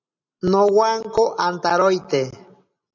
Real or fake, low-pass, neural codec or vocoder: real; 7.2 kHz; none